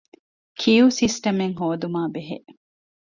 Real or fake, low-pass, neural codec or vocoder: real; 7.2 kHz; none